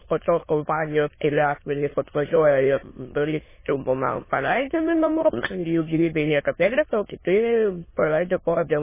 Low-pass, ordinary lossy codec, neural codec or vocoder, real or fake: 3.6 kHz; MP3, 16 kbps; autoencoder, 22.05 kHz, a latent of 192 numbers a frame, VITS, trained on many speakers; fake